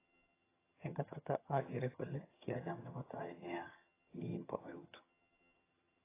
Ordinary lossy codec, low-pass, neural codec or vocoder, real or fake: AAC, 16 kbps; 3.6 kHz; vocoder, 22.05 kHz, 80 mel bands, HiFi-GAN; fake